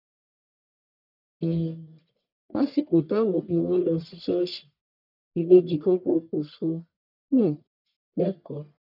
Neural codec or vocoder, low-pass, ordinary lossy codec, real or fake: codec, 44.1 kHz, 1.7 kbps, Pupu-Codec; 5.4 kHz; none; fake